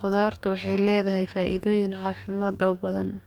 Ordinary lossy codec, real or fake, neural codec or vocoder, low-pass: none; fake; codec, 44.1 kHz, 2.6 kbps, DAC; 19.8 kHz